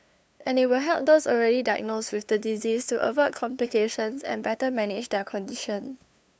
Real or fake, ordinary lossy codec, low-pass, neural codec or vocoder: fake; none; none; codec, 16 kHz, 2 kbps, FunCodec, trained on LibriTTS, 25 frames a second